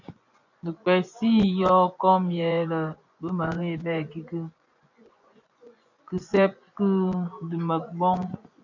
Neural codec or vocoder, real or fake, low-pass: vocoder, 24 kHz, 100 mel bands, Vocos; fake; 7.2 kHz